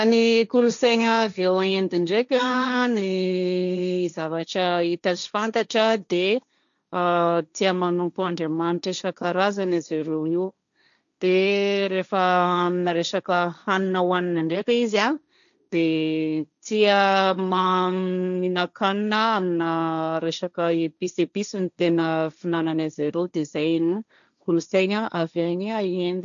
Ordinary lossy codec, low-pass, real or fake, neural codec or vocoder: none; 7.2 kHz; fake; codec, 16 kHz, 1.1 kbps, Voila-Tokenizer